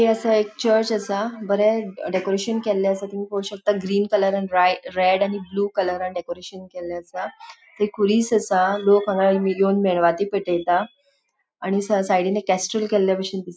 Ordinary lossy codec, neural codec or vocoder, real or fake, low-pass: none; none; real; none